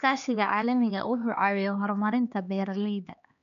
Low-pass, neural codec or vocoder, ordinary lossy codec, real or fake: 7.2 kHz; codec, 16 kHz, 2 kbps, X-Codec, HuBERT features, trained on LibriSpeech; none; fake